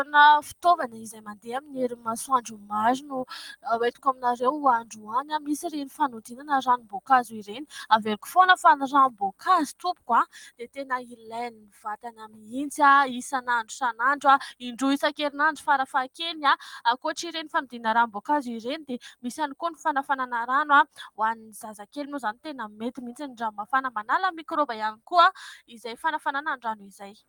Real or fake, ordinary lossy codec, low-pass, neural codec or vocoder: real; Opus, 24 kbps; 19.8 kHz; none